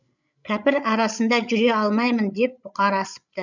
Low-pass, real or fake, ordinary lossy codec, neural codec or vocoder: 7.2 kHz; fake; none; codec, 16 kHz, 16 kbps, FreqCodec, larger model